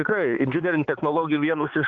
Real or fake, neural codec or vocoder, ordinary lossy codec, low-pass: fake; codec, 16 kHz, 4 kbps, X-Codec, HuBERT features, trained on balanced general audio; Opus, 16 kbps; 7.2 kHz